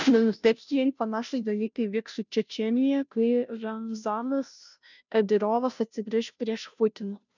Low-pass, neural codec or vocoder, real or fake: 7.2 kHz; codec, 16 kHz, 0.5 kbps, FunCodec, trained on Chinese and English, 25 frames a second; fake